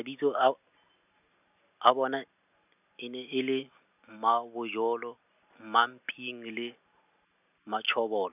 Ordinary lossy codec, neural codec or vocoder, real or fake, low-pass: none; none; real; 3.6 kHz